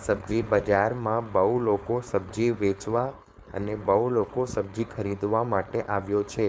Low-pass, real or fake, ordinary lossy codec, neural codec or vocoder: none; fake; none; codec, 16 kHz, 4.8 kbps, FACodec